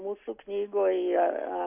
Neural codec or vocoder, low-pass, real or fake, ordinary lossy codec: none; 3.6 kHz; real; AAC, 32 kbps